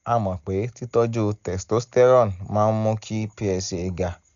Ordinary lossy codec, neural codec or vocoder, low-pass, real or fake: none; none; 7.2 kHz; real